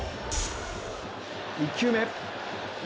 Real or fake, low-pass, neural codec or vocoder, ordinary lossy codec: real; none; none; none